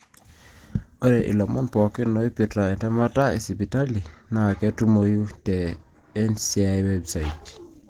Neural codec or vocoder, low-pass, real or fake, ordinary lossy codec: none; 19.8 kHz; real; Opus, 16 kbps